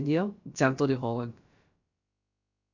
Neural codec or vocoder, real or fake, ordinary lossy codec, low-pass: codec, 16 kHz, about 1 kbps, DyCAST, with the encoder's durations; fake; none; 7.2 kHz